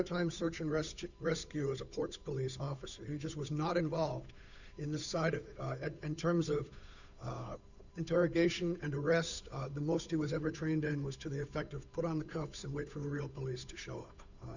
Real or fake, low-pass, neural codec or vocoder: fake; 7.2 kHz; codec, 16 kHz, 8 kbps, FunCodec, trained on Chinese and English, 25 frames a second